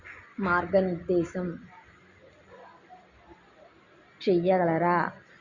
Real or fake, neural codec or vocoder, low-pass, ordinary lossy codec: real; none; 7.2 kHz; Opus, 64 kbps